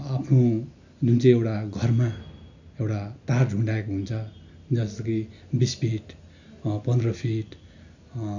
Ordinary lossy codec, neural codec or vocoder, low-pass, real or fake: none; none; 7.2 kHz; real